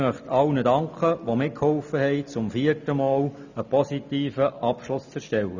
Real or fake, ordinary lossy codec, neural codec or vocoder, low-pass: real; none; none; 7.2 kHz